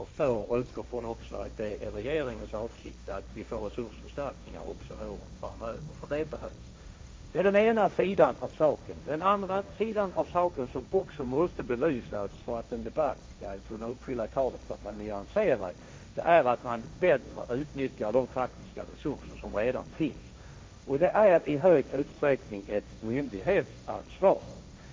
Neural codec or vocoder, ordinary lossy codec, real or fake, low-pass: codec, 16 kHz, 1.1 kbps, Voila-Tokenizer; none; fake; none